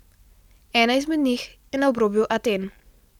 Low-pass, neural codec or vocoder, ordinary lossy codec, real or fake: 19.8 kHz; none; none; real